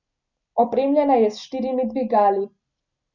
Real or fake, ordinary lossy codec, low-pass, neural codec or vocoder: real; none; 7.2 kHz; none